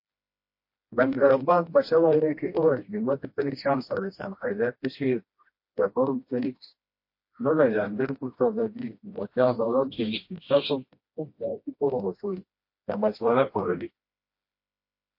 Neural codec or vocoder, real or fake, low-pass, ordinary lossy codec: codec, 16 kHz, 1 kbps, FreqCodec, smaller model; fake; 5.4 kHz; MP3, 32 kbps